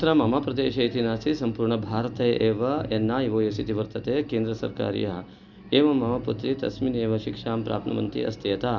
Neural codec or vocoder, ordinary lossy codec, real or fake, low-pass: none; none; real; 7.2 kHz